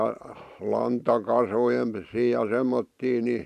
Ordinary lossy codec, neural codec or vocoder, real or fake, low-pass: none; vocoder, 44.1 kHz, 128 mel bands every 512 samples, BigVGAN v2; fake; 14.4 kHz